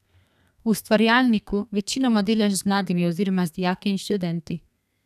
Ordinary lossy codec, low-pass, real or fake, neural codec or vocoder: none; 14.4 kHz; fake; codec, 32 kHz, 1.9 kbps, SNAC